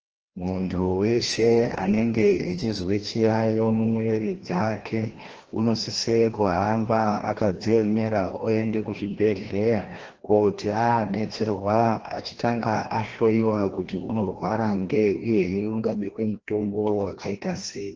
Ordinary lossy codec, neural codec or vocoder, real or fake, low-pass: Opus, 16 kbps; codec, 16 kHz, 1 kbps, FreqCodec, larger model; fake; 7.2 kHz